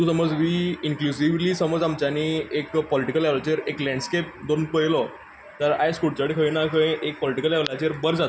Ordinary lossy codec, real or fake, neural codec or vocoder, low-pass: none; real; none; none